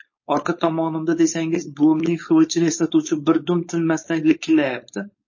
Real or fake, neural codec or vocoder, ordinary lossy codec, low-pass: fake; codec, 16 kHz, 4.8 kbps, FACodec; MP3, 32 kbps; 7.2 kHz